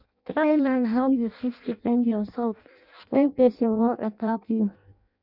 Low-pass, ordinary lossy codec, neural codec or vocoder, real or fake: 5.4 kHz; none; codec, 16 kHz in and 24 kHz out, 0.6 kbps, FireRedTTS-2 codec; fake